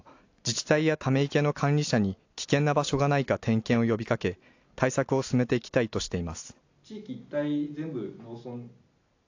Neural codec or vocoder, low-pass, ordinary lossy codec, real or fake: none; 7.2 kHz; AAC, 48 kbps; real